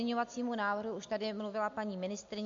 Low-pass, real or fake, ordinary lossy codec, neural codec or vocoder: 7.2 kHz; real; AAC, 48 kbps; none